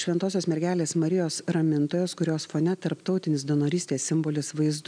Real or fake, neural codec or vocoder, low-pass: real; none; 9.9 kHz